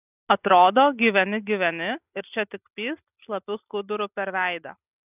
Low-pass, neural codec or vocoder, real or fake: 3.6 kHz; none; real